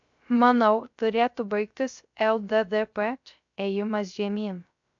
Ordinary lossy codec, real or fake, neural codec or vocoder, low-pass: AAC, 64 kbps; fake; codec, 16 kHz, 0.3 kbps, FocalCodec; 7.2 kHz